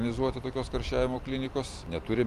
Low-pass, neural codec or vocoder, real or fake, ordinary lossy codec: 14.4 kHz; none; real; Opus, 32 kbps